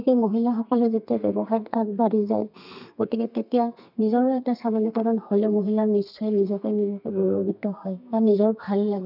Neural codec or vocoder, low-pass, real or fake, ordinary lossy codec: codec, 32 kHz, 1.9 kbps, SNAC; 5.4 kHz; fake; none